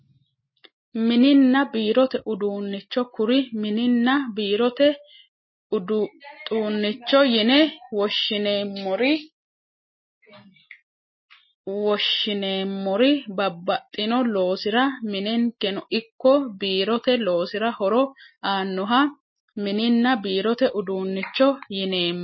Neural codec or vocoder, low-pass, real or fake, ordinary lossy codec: none; 7.2 kHz; real; MP3, 24 kbps